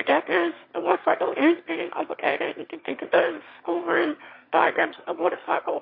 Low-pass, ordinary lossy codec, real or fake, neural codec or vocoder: 5.4 kHz; MP3, 32 kbps; fake; autoencoder, 22.05 kHz, a latent of 192 numbers a frame, VITS, trained on one speaker